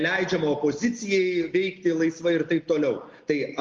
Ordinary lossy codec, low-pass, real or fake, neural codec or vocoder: Opus, 32 kbps; 7.2 kHz; real; none